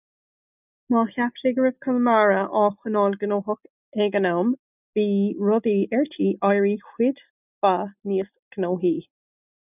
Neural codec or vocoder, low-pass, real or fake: none; 3.6 kHz; real